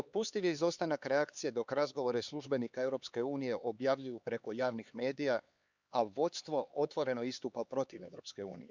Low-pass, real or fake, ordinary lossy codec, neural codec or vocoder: 7.2 kHz; fake; Opus, 64 kbps; codec, 16 kHz, 4 kbps, X-Codec, HuBERT features, trained on LibriSpeech